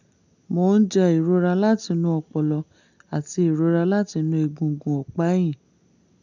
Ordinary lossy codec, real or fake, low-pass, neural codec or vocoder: none; real; 7.2 kHz; none